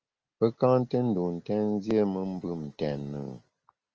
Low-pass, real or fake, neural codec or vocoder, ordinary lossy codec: 7.2 kHz; real; none; Opus, 24 kbps